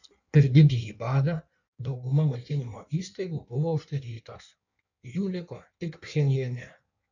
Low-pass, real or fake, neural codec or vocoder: 7.2 kHz; fake; codec, 16 kHz in and 24 kHz out, 1.1 kbps, FireRedTTS-2 codec